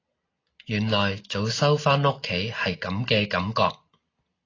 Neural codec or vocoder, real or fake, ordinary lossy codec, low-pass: none; real; AAC, 32 kbps; 7.2 kHz